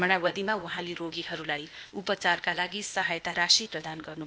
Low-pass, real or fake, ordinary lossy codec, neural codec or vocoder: none; fake; none; codec, 16 kHz, 0.8 kbps, ZipCodec